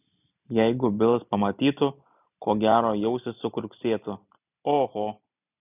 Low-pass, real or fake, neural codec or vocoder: 3.6 kHz; real; none